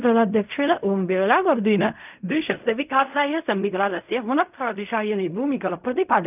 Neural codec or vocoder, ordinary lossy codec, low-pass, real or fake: codec, 16 kHz in and 24 kHz out, 0.4 kbps, LongCat-Audio-Codec, fine tuned four codebook decoder; none; 3.6 kHz; fake